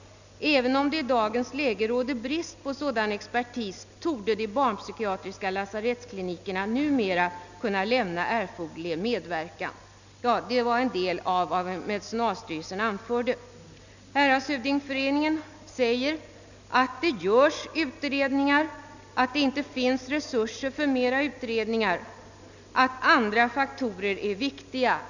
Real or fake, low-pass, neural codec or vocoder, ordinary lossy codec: real; 7.2 kHz; none; none